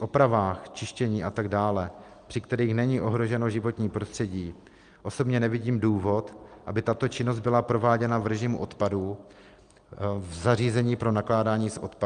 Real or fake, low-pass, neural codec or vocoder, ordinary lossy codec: real; 9.9 kHz; none; Opus, 32 kbps